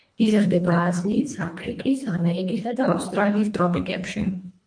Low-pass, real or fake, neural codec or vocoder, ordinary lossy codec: 9.9 kHz; fake; codec, 24 kHz, 1.5 kbps, HILCodec; MP3, 64 kbps